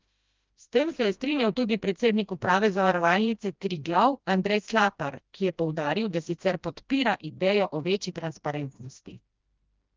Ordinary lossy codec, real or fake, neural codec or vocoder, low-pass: Opus, 24 kbps; fake; codec, 16 kHz, 1 kbps, FreqCodec, smaller model; 7.2 kHz